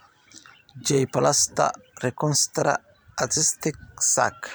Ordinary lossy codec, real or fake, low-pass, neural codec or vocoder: none; fake; none; vocoder, 44.1 kHz, 128 mel bands every 256 samples, BigVGAN v2